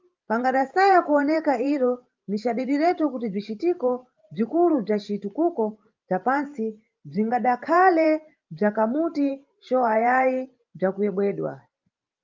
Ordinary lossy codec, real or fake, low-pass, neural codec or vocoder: Opus, 24 kbps; fake; 7.2 kHz; vocoder, 44.1 kHz, 128 mel bands every 512 samples, BigVGAN v2